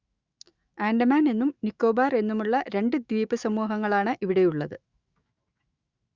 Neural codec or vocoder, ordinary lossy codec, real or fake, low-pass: autoencoder, 48 kHz, 128 numbers a frame, DAC-VAE, trained on Japanese speech; Opus, 64 kbps; fake; 7.2 kHz